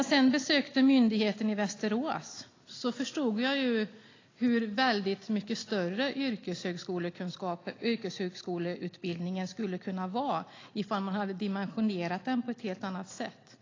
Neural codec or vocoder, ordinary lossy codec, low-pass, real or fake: none; AAC, 32 kbps; 7.2 kHz; real